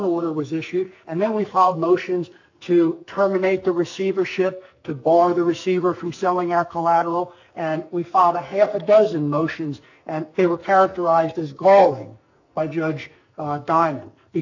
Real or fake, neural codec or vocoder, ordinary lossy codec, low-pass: fake; codec, 44.1 kHz, 2.6 kbps, SNAC; AAC, 48 kbps; 7.2 kHz